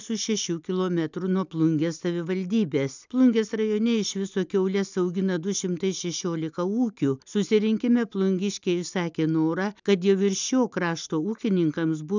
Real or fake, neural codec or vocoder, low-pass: real; none; 7.2 kHz